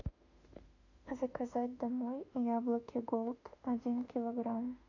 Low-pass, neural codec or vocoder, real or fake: 7.2 kHz; autoencoder, 48 kHz, 32 numbers a frame, DAC-VAE, trained on Japanese speech; fake